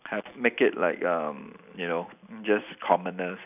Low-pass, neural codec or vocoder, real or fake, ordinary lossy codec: 3.6 kHz; none; real; none